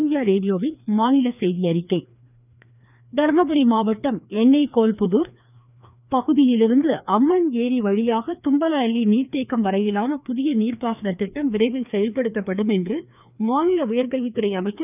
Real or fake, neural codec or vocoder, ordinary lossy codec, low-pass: fake; codec, 16 kHz, 2 kbps, FreqCodec, larger model; none; 3.6 kHz